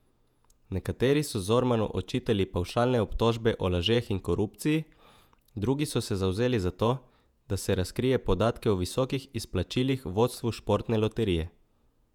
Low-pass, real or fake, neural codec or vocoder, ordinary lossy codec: 19.8 kHz; fake; vocoder, 48 kHz, 128 mel bands, Vocos; none